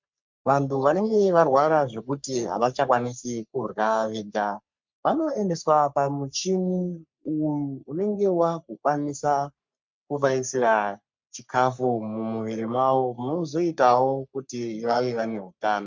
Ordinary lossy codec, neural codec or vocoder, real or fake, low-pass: MP3, 64 kbps; codec, 44.1 kHz, 3.4 kbps, Pupu-Codec; fake; 7.2 kHz